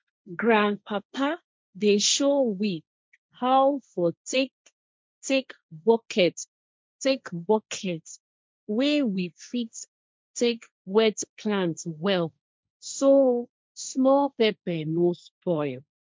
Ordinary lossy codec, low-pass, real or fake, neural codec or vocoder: none; none; fake; codec, 16 kHz, 1.1 kbps, Voila-Tokenizer